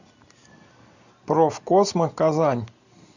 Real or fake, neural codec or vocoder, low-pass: real; none; 7.2 kHz